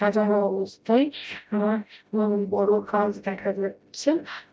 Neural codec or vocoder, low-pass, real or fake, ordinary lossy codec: codec, 16 kHz, 0.5 kbps, FreqCodec, smaller model; none; fake; none